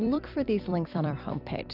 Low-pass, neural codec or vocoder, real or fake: 5.4 kHz; vocoder, 44.1 kHz, 128 mel bands every 256 samples, BigVGAN v2; fake